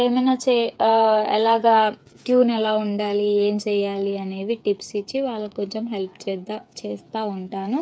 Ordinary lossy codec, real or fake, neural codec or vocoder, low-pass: none; fake; codec, 16 kHz, 8 kbps, FreqCodec, smaller model; none